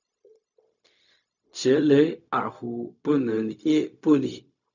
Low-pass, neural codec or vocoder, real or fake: 7.2 kHz; codec, 16 kHz, 0.4 kbps, LongCat-Audio-Codec; fake